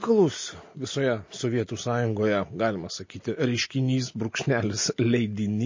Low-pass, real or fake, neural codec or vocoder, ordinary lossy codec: 7.2 kHz; real; none; MP3, 32 kbps